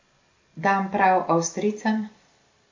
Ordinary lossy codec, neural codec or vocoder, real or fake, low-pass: MP3, 48 kbps; none; real; 7.2 kHz